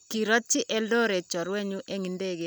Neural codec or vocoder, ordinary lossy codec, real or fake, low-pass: none; none; real; none